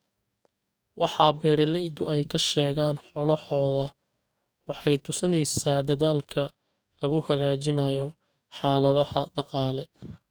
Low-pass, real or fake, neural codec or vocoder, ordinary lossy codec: none; fake; codec, 44.1 kHz, 2.6 kbps, DAC; none